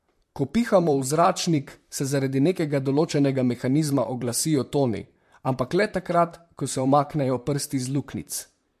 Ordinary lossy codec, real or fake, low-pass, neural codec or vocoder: MP3, 64 kbps; fake; 14.4 kHz; vocoder, 44.1 kHz, 128 mel bands, Pupu-Vocoder